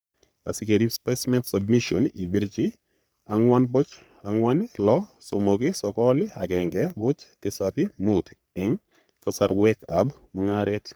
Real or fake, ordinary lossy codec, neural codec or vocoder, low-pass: fake; none; codec, 44.1 kHz, 3.4 kbps, Pupu-Codec; none